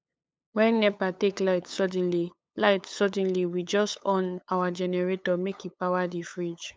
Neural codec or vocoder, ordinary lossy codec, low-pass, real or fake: codec, 16 kHz, 8 kbps, FunCodec, trained on LibriTTS, 25 frames a second; none; none; fake